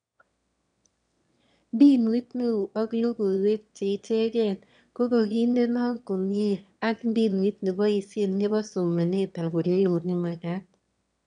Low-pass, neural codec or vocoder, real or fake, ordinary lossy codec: 9.9 kHz; autoencoder, 22.05 kHz, a latent of 192 numbers a frame, VITS, trained on one speaker; fake; none